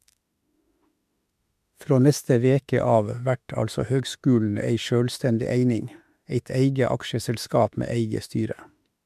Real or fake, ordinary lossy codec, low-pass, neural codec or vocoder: fake; MP3, 96 kbps; 14.4 kHz; autoencoder, 48 kHz, 32 numbers a frame, DAC-VAE, trained on Japanese speech